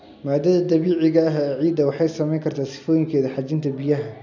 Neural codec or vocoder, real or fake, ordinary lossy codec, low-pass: none; real; none; 7.2 kHz